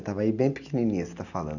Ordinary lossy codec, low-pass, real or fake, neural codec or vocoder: none; 7.2 kHz; real; none